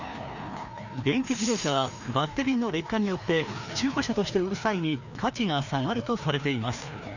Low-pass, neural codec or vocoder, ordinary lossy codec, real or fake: 7.2 kHz; codec, 16 kHz, 2 kbps, FreqCodec, larger model; none; fake